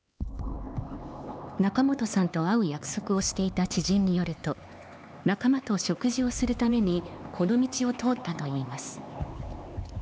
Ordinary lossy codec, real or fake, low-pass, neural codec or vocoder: none; fake; none; codec, 16 kHz, 4 kbps, X-Codec, HuBERT features, trained on LibriSpeech